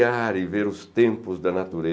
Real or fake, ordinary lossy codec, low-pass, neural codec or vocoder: real; none; none; none